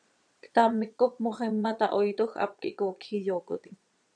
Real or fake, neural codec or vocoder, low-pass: fake; vocoder, 22.05 kHz, 80 mel bands, Vocos; 9.9 kHz